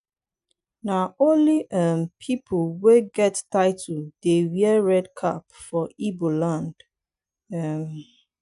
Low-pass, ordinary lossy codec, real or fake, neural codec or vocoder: 10.8 kHz; MP3, 96 kbps; real; none